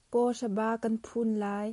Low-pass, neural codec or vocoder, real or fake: 10.8 kHz; none; real